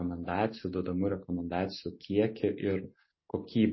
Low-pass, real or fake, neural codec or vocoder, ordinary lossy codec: 7.2 kHz; real; none; MP3, 24 kbps